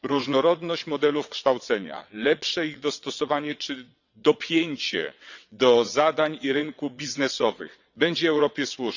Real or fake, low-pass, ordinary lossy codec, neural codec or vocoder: fake; 7.2 kHz; none; vocoder, 22.05 kHz, 80 mel bands, WaveNeXt